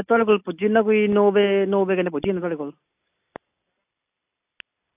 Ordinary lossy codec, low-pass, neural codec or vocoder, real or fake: AAC, 32 kbps; 3.6 kHz; none; real